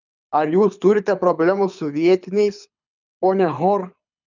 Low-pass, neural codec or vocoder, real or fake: 7.2 kHz; codec, 24 kHz, 6 kbps, HILCodec; fake